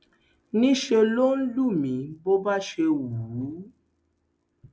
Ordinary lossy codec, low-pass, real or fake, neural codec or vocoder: none; none; real; none